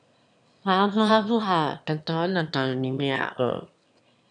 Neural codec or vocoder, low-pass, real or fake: autoencoder, 22.05 kHz, a latent of 192 numbers a frame, VITS, trained on one speaker; 9.9 kHz; fake